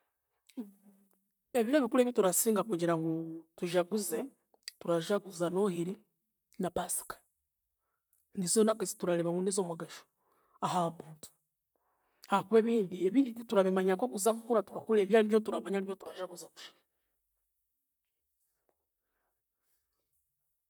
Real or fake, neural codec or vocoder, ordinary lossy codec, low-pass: fake; vocoder, 44.1 kHz, 128 mel bands, Pupu-Vocoder; none; none